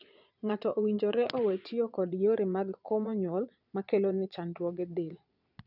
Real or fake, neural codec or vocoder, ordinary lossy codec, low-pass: fake; vocoder, 22.05 kHz, 80 mel bands, Vocos; none; 5.4 kHz